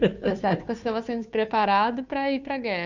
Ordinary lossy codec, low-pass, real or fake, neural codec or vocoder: none; 7.2 kHz; fake; codec, 16 kHz, 2 kbps, FunCodec, trained on Chinese and English, 25 frames a second